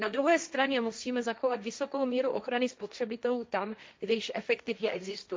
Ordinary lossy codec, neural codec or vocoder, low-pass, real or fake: none; codec, 16 kHz, 1.1 kbps, Voila-Tokenizer; none; fake